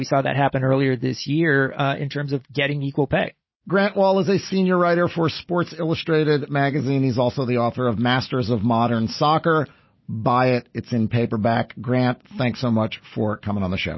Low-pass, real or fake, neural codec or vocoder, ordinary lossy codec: 7.2 kHz; real; none; MP3, 24 kbps